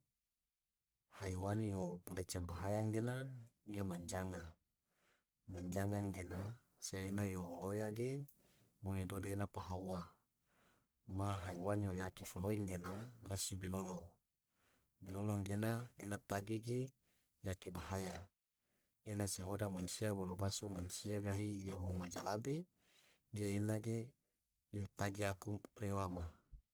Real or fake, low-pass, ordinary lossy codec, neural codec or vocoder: fake; none; none; codec, 44.1 kHz, 1.7 kbps, Pupu-Codec